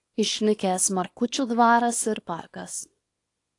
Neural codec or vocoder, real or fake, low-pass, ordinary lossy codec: codec, 24 kHz, 0.9 kbps, WavTokenizer, small release; fake; 10.8 kHz; AAC, 48 kbps